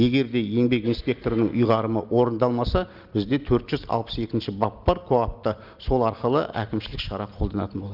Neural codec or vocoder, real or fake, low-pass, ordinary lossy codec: none; real; 5.4 kHz; Opus, 24 kbps